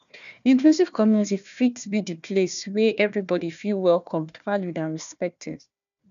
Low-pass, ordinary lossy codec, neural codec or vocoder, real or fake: 7.2 kHz; none; codec, 16 kHz, 1 kbps, FunCodec, trained on Chinese and English, 50 frames a second; fake